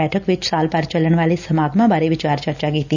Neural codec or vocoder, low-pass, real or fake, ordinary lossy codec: none; 7.2 kHz; real; none